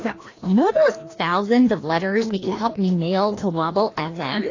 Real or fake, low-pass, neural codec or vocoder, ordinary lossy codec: fake; 7.2 kHz; codec, 16 kHz, 1 kbps, FreqCodec, larger model; AAC, 32 kbps